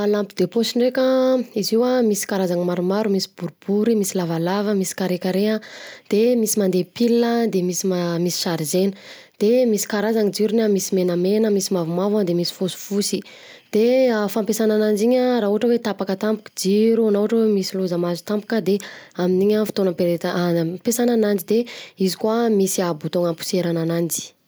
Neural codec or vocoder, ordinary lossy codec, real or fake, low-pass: none; none; real; none